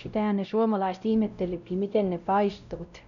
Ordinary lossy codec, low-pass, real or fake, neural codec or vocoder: none; 7.2 kHz; fake; codec, 16 kHz, 0.5 kbps, X-Codec, WavLM features, trained on Multilingual LibriSpeech